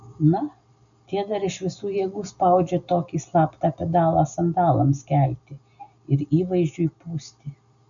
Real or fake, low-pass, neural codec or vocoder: real; 7.2 kHz; none